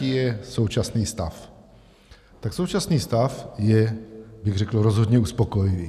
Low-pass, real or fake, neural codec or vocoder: 14.4 kHz; real; none